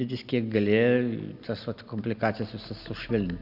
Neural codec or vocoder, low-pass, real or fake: none; 5.4 kHz; real